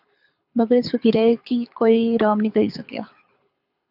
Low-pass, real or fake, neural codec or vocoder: 5.4 kHz; fake; codec, 24 kHz, 6 kbps, HILCodec